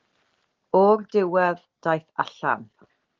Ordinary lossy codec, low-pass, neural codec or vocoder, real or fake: Opus, 16 kbps; 7.2 kHz; none; real